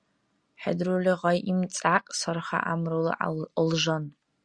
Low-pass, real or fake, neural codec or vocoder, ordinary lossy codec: 9.9 kHz; real; none; Opus, 64 kbps